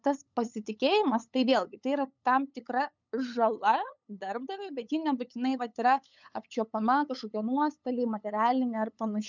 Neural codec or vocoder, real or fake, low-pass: codec, 16 kHz, 8 kbps, FunCodec, trained on LibriTTS, 25 frames a second; fake; 7.2 kHz